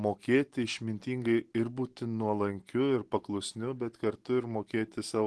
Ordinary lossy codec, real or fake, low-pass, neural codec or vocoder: Opus, 16 kbps; real; 10.8 kHz; none